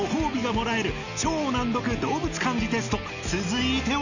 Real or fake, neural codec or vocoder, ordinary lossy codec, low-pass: real; none; none; 7.2 kHz